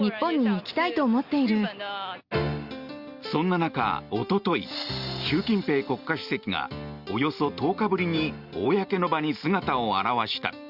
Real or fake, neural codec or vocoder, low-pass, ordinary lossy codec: real; none; 5.4 kHz; Opus, 64 kbps